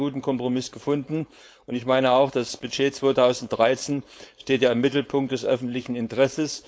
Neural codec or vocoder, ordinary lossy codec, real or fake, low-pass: codec, 16 kHz, 4.8 kbps, FACodec; none; fake; none